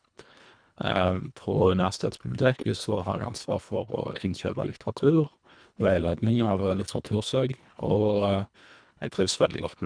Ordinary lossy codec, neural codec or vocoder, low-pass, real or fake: none; codec, 24 kHz, 1.5 kbps, HILCodec; 9.9 kHz; fake